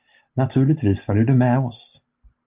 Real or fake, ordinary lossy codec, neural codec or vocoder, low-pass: real; Opus, 32 kbps; none; 3.6 kHz